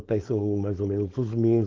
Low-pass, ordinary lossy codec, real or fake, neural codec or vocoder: 7.2 kHz; Opus, 16 kbps; fake; codec, 16 kHz, 4.8 kbps, FACodec